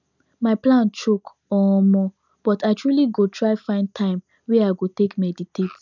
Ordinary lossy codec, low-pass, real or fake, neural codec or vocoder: none; 7.2 kHz; real; none